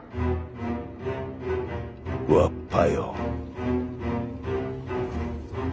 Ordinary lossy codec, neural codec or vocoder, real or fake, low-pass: none; none; real; none